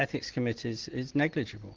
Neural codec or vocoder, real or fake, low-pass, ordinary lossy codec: none; real; 7.2 kHz; Opus, 16 kbps